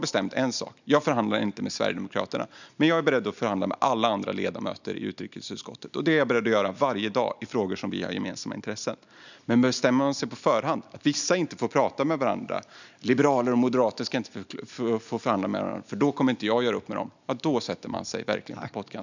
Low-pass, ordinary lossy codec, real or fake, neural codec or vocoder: 7.2 kHz; none; real; none